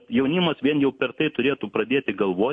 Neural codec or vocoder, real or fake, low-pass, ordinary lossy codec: none; real; 9.9 kHz; MP3, 48 kbps